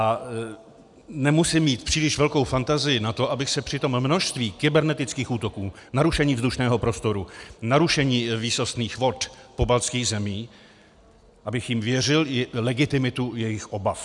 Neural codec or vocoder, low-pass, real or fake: none; 10.8 kHz; real